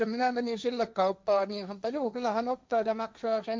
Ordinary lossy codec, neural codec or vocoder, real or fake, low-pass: none; codec, 16 kHz, 1.1 kbps, Voila-Tokenizer; fake; none